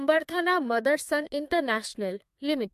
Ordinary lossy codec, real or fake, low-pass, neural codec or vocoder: MP3, 64 kbps; fake; 14.4 kHz; codec, 32 kHz, 1.9 kbps, SNAC